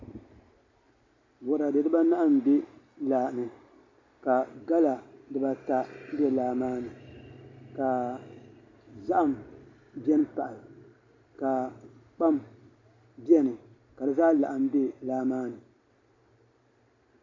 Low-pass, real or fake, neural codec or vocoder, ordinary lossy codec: 7.2 kHz; real; none; MP3, 48 kbps